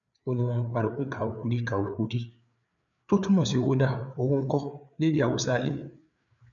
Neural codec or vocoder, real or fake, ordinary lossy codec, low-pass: codec, 16 kHz, 4 kbps, FreqCodec, larger model; fake; none; 7.2 kHz